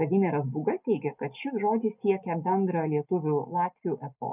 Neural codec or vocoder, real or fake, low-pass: none; real; 3.6 kHz